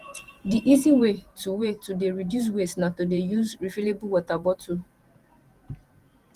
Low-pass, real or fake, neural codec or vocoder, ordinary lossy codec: 14.4 kHz; fake; vocoder, 48 kHz, 128 mel bands, Vocos; Opus, 24 kbps